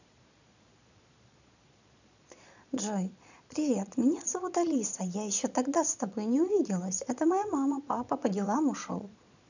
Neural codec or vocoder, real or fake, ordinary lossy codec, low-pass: vocoder, 22.05 kHz, 80 mel bands, Vocos; fake; none; 7.2 kHz